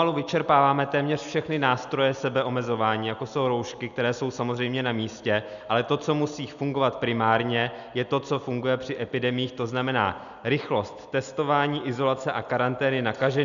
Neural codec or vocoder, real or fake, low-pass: none; real; 7.2 kHz